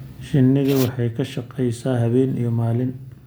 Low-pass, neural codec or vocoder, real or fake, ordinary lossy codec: none; none; real; none